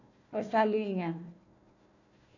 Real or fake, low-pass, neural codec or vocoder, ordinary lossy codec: fake; 7.2 kHz; codec, 16 kHz, 1 kbps, FunCodec, trained on Chinese and English, 50 frames a second; none